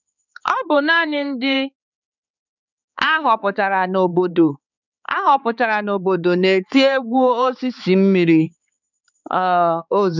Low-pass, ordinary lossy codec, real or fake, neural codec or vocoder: 7.2 kHz; none; fake; codec, 16 kHz, 4 kbps, X-Codec, HuBERT features, trained on balanced general audio